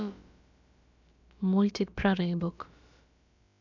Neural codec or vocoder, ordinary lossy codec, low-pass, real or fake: codec, 16 kHz, about 1 kbps, DyCAST, with the encoder's durations; none; 7.2 kHz; fake